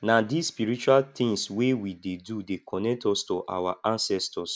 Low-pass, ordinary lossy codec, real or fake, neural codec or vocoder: none; none; real; none